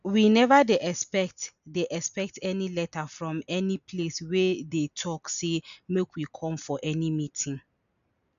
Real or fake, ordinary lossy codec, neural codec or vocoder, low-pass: real; none; none; 7.2 kHz